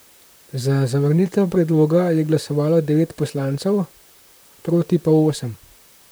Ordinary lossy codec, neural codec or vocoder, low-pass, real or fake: none; vocoder, 44.1 kHz, 128 mel bands, Pupu-Vocoder; none; fake